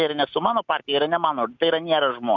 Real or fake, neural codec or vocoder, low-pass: real; none; 7.2 kHz